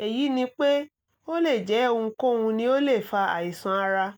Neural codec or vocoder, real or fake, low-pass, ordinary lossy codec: none; real; none; none